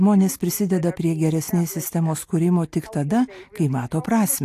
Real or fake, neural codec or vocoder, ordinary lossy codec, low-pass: real; none; AAC, 64 kbps; 14.4 kHz